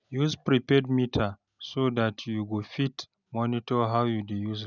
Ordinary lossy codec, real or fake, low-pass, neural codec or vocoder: none; real; 7.2 kHz; none